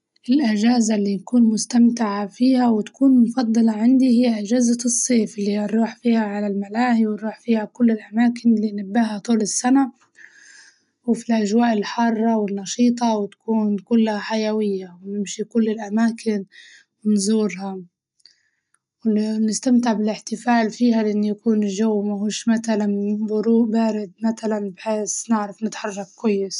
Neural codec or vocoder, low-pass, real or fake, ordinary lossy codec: none; 10.8 kHz; real; none